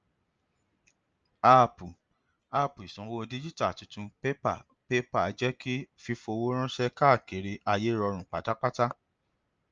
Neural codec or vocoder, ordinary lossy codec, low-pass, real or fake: none; Opus, 24 kbps; 7.2 kHz; real